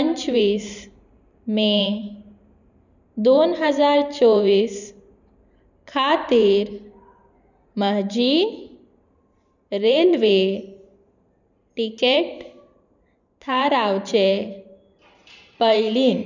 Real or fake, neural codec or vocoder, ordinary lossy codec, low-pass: fake; vocoder, 44.1 kHz, 128 mel bands every 512 samples, BigVGAN v2; none; 7.2 kHz